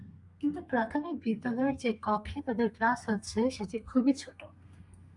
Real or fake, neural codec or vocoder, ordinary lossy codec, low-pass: fake; codec, 32 kHz, 1.9 kbps, SNAC; Opus, 64 kbps; 10.8 kHz